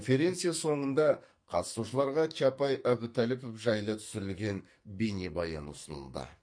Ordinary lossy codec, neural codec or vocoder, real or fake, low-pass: MP3, 48 kbps; codec, 44.1 kHz, 2.6 kbps, SNAC; fake; 9.9 kHz